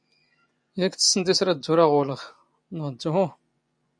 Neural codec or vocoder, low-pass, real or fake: vocoder, 24 kHz, 100 mel bands, Vocos; 9.9 kHz; fake